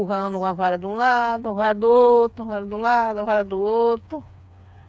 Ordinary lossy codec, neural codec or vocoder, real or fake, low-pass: none; codec, 16 kHz, 4 kbps, FreqCodec, smaller model; fake; none